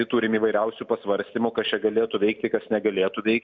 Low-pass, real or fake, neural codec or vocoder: 7.2 kHz; real; none